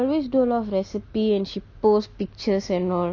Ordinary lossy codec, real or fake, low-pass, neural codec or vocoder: none; real; 7.2 kHz; none